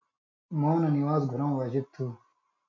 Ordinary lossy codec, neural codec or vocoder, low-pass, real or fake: MP3, 48 kbps; none; 7.2 kHz; real